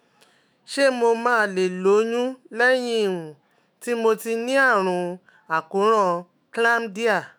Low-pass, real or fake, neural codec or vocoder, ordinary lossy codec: none; fake; autoencoder, 48 kHz, 128 numbers a frame, DAC-VAE, trained on Japanese speech; none